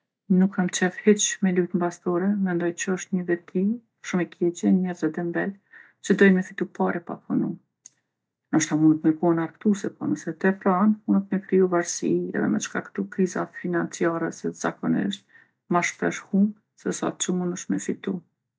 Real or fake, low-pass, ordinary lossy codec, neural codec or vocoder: real; none; none; none